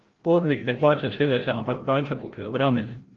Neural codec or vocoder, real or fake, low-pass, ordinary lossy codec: codec, 16 kHz, 0.5 kbps, FreqCodec, larger model; fake; 7.2 kHz; Opus, 16 kbps